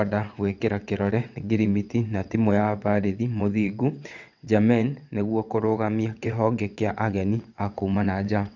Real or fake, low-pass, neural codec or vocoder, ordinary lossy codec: fake; 7.2 kHz; vocoder, 22.05 kHz, 80 mel bands, WaveNeXt; none